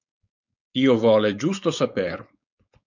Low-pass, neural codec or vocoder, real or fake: 7.2 kHz; codec, 16 kHz, 4.8 kbps, FACodec; fake